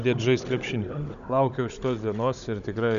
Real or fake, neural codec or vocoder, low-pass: fake; codec, 16 kHz, 16 kbps, FunCodec, trained on Chinese and English, 50 frames a second; 7.2 kHz